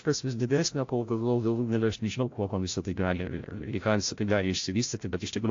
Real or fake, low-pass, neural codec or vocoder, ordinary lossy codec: fake; 7.2 kHz; codec, 16 kHz, 0.5 kbps, FreqCodec, larger model; AAC, 48 kbps